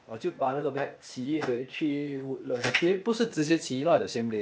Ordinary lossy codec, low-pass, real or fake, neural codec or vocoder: none; none; fake; codec, 16 kHz, 0.8 kbps, ZipCodec